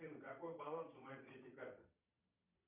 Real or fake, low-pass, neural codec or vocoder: fake; 3.6 kHz; vocoder, 22.05 kHz, 80 mel bands, Vocos